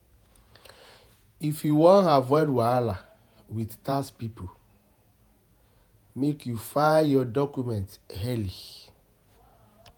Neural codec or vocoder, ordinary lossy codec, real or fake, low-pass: vocoder, 48 kHz, 128 mel bands, Vocos; none; fake; none